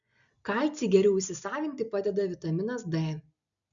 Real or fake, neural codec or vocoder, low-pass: real; none; 7.2 kHz